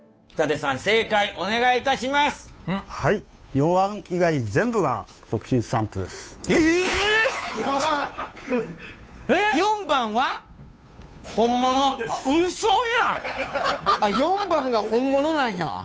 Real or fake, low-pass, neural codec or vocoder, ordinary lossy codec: fake; none; codec, 16 kHz, 2 kbps, FunCodec, trained on Chinese and English, 25 frames a second; none